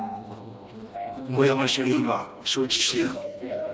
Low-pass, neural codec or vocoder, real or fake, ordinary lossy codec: none; codec, 16 kHz, 1 kbps, FreqCodec, smaller model; fake; none